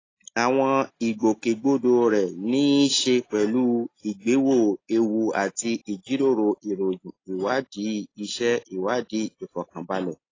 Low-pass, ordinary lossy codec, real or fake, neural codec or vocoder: 7.2 kHz; AAC, 32 kbps; real; none